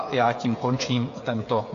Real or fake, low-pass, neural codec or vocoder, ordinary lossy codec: fake; 7.2 kHz; codec, 16 kHz, 8 kbps, FreqCodec, smaller model; AAC, 48 kbps